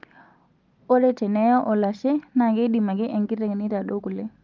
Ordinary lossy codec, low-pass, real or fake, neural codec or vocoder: Opus, 24 kbps; 7.2 kHz; real; none